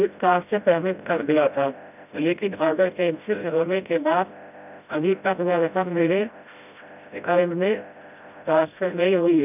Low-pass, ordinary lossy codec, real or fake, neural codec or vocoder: 3.6 kHz; none; fake; codec, 16 kHz, 0.5 kbps, FreqCodec, smaller model